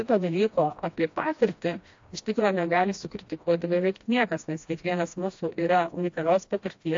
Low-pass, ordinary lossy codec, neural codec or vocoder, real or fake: 7.2 kHz; AAC, 48 kbps; codec, 16 kHz, 1 kbps, FreqCodec, smaller model; fake